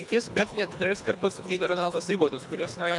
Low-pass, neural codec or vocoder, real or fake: 10.8 kHz; codec, 24 kHz, 1.5 kbps, HILCodec; fake